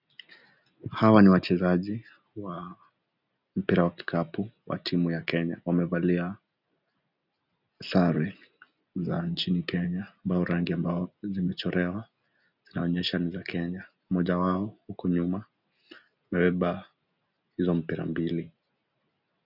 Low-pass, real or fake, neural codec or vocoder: 5.4 kHz; real; none